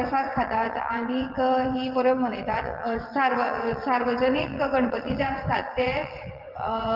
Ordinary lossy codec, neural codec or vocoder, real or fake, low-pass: Opus, 24 kbps; vocoder, 22.05 kHz, 80 mel bands, WaveNeXt; fake; 5.4 kHz